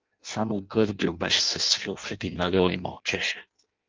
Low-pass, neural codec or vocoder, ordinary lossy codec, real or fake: 7.2 kHz; codec, 16 kHz in and 24 kHz out, 0.6 kbps, FireRedTTS-2 codec; Opus, 32 kbps; fake